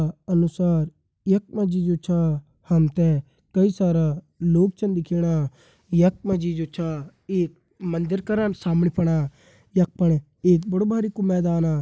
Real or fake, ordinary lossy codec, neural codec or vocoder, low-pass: real; none; none; none